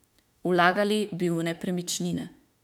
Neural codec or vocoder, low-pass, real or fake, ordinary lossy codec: autoencoder, 48 kHz, 32 numbers a frame, DAC-VAE, trained on Japanese speech; 19.8 kHz; fake; none